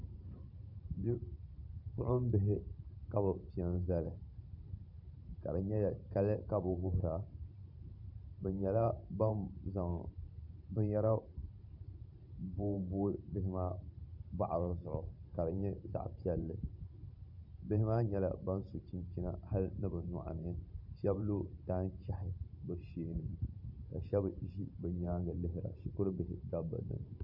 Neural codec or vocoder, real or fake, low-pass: vocoder, 44.1 kHz, 128 mel bands every 512 samples, BigVGAN v2; fake; 5.4 kHz